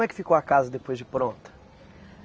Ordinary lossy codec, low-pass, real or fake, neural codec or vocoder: none; none; real; none